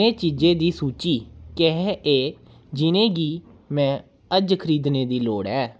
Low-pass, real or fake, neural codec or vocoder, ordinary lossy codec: none; real; none; none